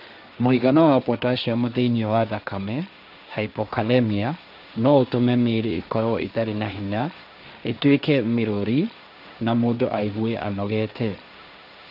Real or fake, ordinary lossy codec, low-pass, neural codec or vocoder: fake; none; 5.4 kHz; codec, 16 kHz, 1.1 kbps, Voila-Tokenizer